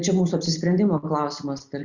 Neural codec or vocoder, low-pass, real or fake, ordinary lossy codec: none; 7.2 kHz; real; Opus, 64 kbps